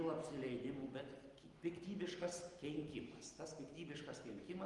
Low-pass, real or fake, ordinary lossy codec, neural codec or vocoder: 10.8 kHz; real; Opus, 24 kbps; none